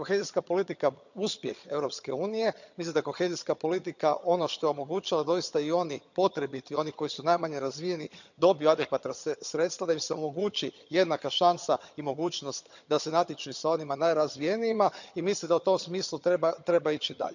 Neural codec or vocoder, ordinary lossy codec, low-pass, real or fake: vocoder, 22.05 kHz, 80 mel bands, HiFi-GAN; none; 7.2 kHz; fake